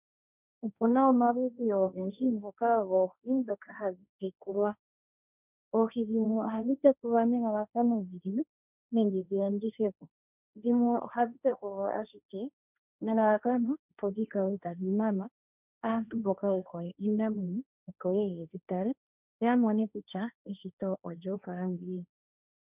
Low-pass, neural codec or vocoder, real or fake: 3.6 kHz; codec, 16 kHz, 1.1 kbps, Voila-Tokenizer; fake